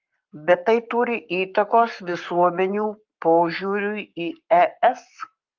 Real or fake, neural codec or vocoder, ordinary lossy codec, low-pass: fake; codec, 44.1 kHz, 7.8 kbps, Pupu-Codec; Opus, 32 kbps; 7.2 kHz